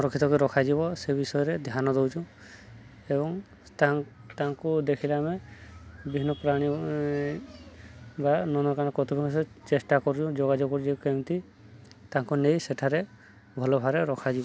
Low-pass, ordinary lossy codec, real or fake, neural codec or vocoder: none; none; real; none